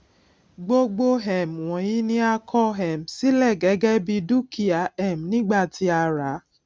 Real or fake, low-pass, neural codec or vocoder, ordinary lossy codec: real; none; none; none